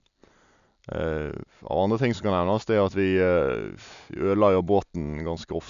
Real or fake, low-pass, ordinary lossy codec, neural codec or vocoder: real; 7.2 kHz; none; none